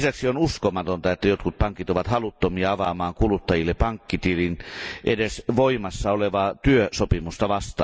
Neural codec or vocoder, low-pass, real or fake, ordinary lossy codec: none; none; real; none